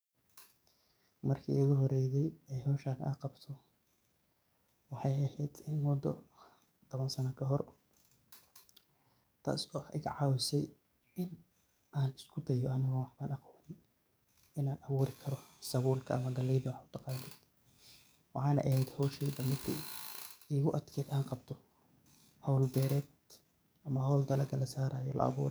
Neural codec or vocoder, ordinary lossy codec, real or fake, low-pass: codec, 44.1 kHz, 7.8 kbps, DAC; none; fake; none